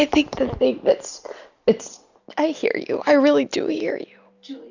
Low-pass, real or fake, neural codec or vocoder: 7.2 kHz; real; none